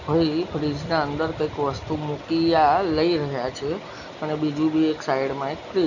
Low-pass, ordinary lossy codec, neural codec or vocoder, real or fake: 7.2 kHz; none; none; real